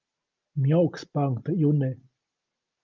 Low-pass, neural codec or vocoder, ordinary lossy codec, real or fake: 7.2 kHz; none; Opus, 32 kbps; real